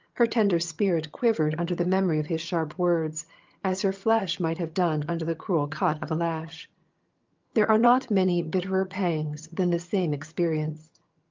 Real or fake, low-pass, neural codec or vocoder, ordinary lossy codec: fake; 7.2 kHz; vocoder, 22.05 kHz, 80 mel bands, HiFi-GAN; Opus, 24 kbps